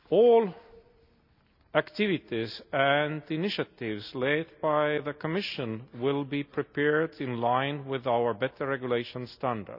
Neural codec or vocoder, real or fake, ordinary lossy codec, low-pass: none; real; none; 5.4 kHz